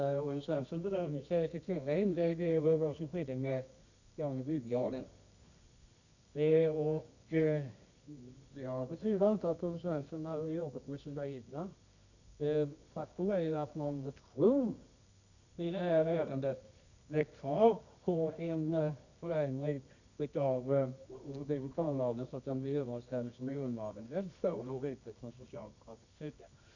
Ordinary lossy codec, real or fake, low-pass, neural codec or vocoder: none; fake; 7.2 kHz; codec, 24 kHz, 0.9 kbps, WavTokenizer, medium music audio release